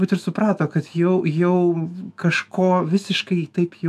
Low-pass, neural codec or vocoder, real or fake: 14.4 kHz; autoencoder, 48 kHz, 128 numbers a frame, DAC-VAE, trained on Japanese speech; fake